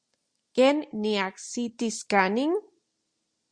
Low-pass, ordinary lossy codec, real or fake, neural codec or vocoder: 9.9 kHz; Opus, 64 kbps; real; none